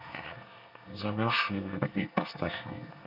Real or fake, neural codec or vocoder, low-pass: fake; codec, 24 kHz, 1 kbps, SNAC; 5.4 kHz